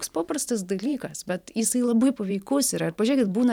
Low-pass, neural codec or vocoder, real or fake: 19.8 kHz; vocoder, 44.1 kHz, 128 mel bands every 512 samples, BigVGAN v2; fake